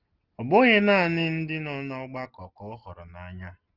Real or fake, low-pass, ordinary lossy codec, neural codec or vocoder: real; 5.4 kHz; Opus, 16 kbps; none